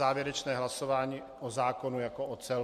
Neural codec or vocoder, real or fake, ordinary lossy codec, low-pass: none; real; MP3, 64 kbps; 14.4 kHz